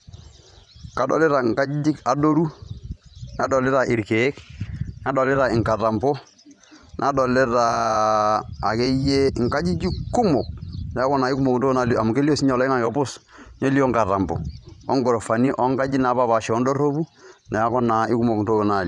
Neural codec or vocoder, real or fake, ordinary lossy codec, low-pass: vocoder, 24 kHz, 100 mel bands, Vocos; fake; none; 10.8 kHz